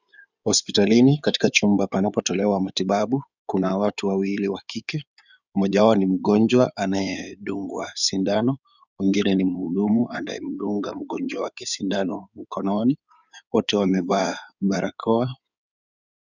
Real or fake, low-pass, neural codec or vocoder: fake; 7.2 kHz; codec, 16 kHz in and 24 kHz out, 2.2 kbps, FireRedTTS-2 codec